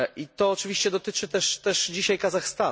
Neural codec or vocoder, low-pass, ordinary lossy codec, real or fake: none; none; none; real